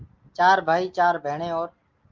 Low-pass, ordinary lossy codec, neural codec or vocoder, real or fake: 7.2 kHz; Opus, 24 kbps; none; real